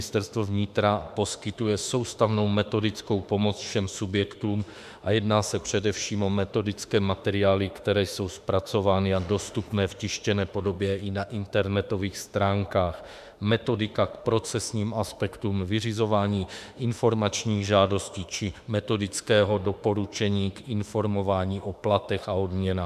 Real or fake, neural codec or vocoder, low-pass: fake; autoencoder, 48 kHz, 32 numbers a frame, DAC-VAE, trained on Japanese speech; 14.4 kHz